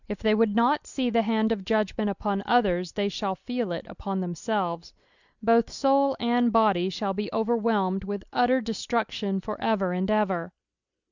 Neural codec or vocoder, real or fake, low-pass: none; real; 7.2 kHz